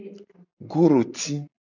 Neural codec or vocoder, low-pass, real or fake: none; 7.2 kHz; real